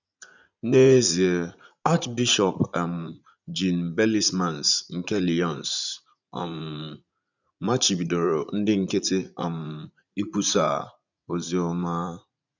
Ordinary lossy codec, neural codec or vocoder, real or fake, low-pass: none; vocoder, 44.1 kHz, 80 mel bands, Vocos; fake; 7.2 kHz